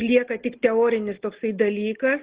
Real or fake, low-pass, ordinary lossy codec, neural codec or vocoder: real; 3.6 kHz; Opus, 16 kbps; none